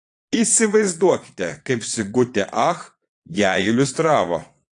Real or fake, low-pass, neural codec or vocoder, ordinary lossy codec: fake; 9.9 kHz; vocoder, 22.05 kHz, 80 mel bands, Vocos; AAC, 48 kbps